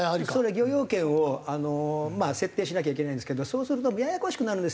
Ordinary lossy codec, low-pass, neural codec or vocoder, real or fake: none; none; none; real